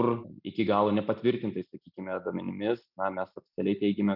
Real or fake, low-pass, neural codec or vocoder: real; 5.4 kHz; none